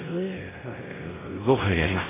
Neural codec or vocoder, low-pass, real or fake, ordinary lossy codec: codec, 16 kHz, 0.5 kbps, X-Codec, WavLM features, trained on Multilingual LibriSpeech; 3.6 kHz; fake; AAC, 16 kbps